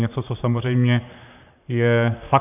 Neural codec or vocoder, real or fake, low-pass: vocoder, 44.1 kHz, 128 mel bands, Pupu-Vocoder; fake; 3.6 kHz